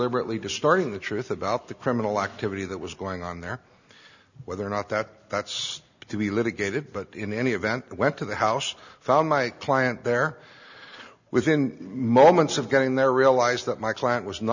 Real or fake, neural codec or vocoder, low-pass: real; none; 7.2 kHz